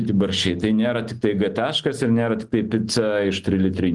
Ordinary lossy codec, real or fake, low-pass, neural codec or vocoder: Opus, 24 kbps; real; 10.8 kHz; none